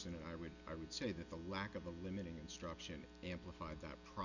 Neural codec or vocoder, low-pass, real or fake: none; 7.2 kHz; real